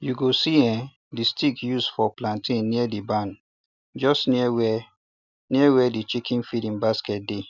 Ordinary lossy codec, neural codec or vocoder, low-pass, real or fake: none; none; 7.2 kHz; real